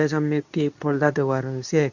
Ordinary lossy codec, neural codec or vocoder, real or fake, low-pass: none; codec, 24 kHz, 0.9 kbps, WavTokenizer, medium speech release version 2; fake; 7.2 kHz